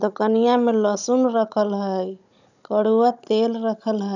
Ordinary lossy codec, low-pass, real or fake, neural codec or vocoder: none; 7.2 kHz; fake; codec, 16 kHz, 16 kbps, FunCodec, trained on Chinese and English, 50 frames a second